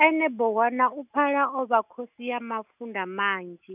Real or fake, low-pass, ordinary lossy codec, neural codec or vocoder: real; 3.6 kHz; none; none